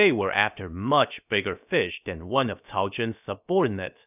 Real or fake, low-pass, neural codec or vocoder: fake; 3.6 kHz; codec, 16 kHz, about 1 kbps, DyCAST, with the encoder's durations